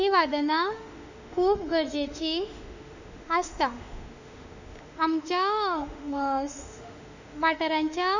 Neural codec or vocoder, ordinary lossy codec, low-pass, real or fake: autoencoder, 48 kHz, 32 numbers a frame, DAC-VAE, trained on Japanese speech; none; 7.2 kHz; fake